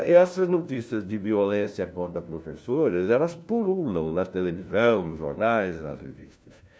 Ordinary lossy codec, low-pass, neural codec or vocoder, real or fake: none; none; codec, 16 kHz, 1 kbps, FunCodec, trained on LibriTTS, 50 frames a second; fake